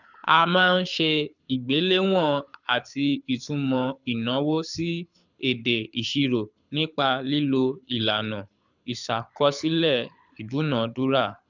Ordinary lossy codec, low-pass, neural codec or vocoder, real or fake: none; 7.2 kHz; codec, 24 kHz, 6 kbps, HILCodec; fake